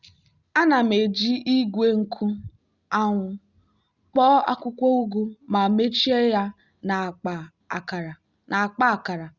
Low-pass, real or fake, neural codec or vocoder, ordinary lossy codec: 7.2 kHz; real; none; Opus, 64 kbps